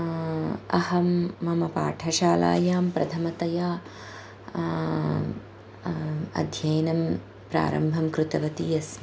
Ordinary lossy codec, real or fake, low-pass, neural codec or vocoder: none; real; none; none